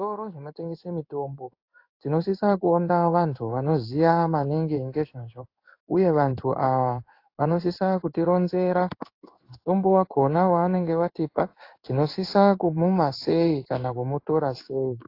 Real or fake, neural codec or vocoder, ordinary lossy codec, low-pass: fake; codec, 16 kHz in and 24 kHz out, 1 kbps, XY-Tokenizer; AAC, 32 kbps; 5.4 kHz